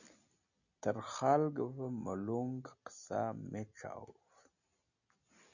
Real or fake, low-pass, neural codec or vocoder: real; 7.2 kHz; none